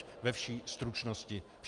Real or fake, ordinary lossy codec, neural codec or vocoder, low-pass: real; Opus, 32 kbps; none; 10.8 kHz